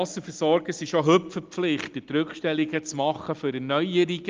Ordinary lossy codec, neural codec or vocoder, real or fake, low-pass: Opus, 32 kbps; none; real; 7.2 kHz